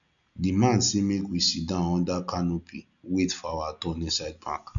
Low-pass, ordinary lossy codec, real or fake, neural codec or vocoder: 7.2 kHz; none; real; none